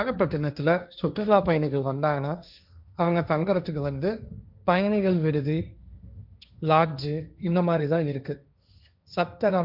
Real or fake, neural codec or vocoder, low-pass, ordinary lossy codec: fake; codec, 16 kHz, 1.1 kbps, Voila-Tokenizer; 5.4 kHz; none